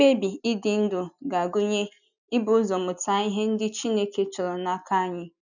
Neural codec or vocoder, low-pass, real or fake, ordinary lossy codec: none; 7.2 kHz; real; none